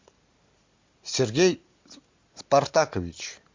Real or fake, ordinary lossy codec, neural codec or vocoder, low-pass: real; MP3, 48 kbps; none; 7.2 kHz